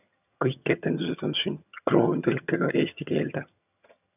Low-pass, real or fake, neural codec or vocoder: 3.6 kHz; fake; vocoder, 22.05 kHz, 80 mel bands, HiFi-GAN